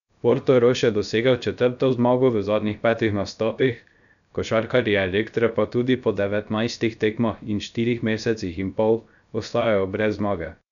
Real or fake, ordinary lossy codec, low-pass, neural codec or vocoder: fake; none; 7.2 kHz; codec, 16 kHz, 0.3 kbps, FocalCodec